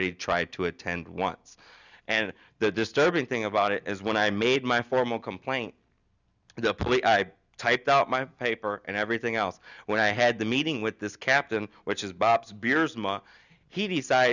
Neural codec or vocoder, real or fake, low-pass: none; real; 7.2 kHz